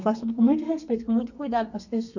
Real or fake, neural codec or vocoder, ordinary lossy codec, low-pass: fake; codec, 32 kHz, 1.9 kbps, SNAC; none; 7.2 kHz